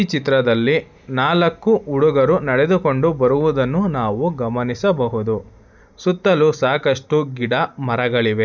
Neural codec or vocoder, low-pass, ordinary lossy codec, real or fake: none; 7.2 kHz; none; real